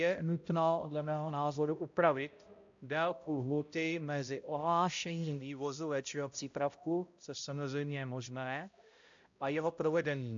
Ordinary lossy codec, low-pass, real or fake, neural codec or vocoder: AAC, 64 kbps; 7.2 kHz; fake; codec, 16 kHz, 0.5 kbps, X-Codec, HuBERT features, trained on balanced general audio